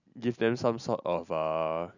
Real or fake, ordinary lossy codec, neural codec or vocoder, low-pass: real; none; none; 7.2 kHz